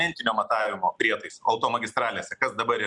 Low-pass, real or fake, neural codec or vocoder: 10.8 kHz; real; none